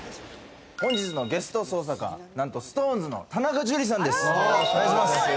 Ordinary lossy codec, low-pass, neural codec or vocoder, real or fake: none; none; none; real